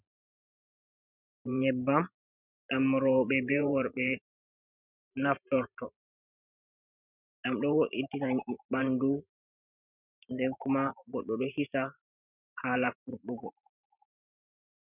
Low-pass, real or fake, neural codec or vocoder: 3.6 kHz; fake; vocoder, 44.1 kHz, 128 mel bands every 512 samples, BigVGAN v2